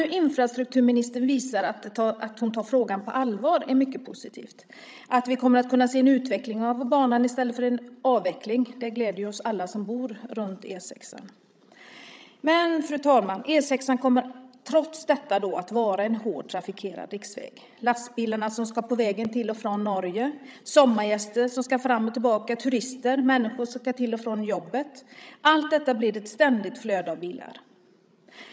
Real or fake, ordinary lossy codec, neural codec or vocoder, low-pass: fake; none; codec, 16 kHz, 16 kbps, FreqCodec, larger model; none